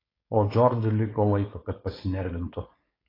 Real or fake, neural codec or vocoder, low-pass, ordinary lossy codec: fake; codec, 16 kHz, 4.8 kbps, FACodec; 5.4 kHz; AAC, 24 kbps